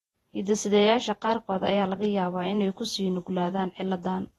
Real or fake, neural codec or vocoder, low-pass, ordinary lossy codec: fake; vocoder, 48 kHz, 128 mel bands, Vocos; 19.8 kHz; AAC, 32 kbps